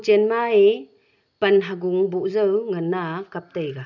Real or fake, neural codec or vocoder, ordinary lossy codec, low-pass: real; none; none; 7.2 kHz